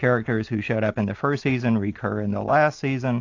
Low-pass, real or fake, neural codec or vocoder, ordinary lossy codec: 7.2 kHz; real; none; AAC, 48 kbps